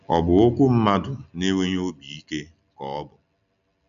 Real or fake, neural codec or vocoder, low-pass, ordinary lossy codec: real; none; 7.2 kHz; none